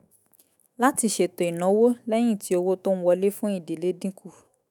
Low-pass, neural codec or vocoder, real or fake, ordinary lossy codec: none; autoencoder, 48 kHz, 128 numbers a frame, DAC-VAE, trained on Japanese speech; fake; none